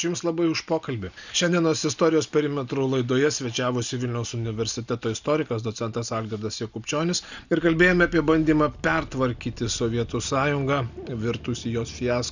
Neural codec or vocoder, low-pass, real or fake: none; 7.2 kHz; real